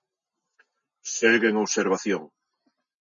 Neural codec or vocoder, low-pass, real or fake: none; 7.2 kHz; real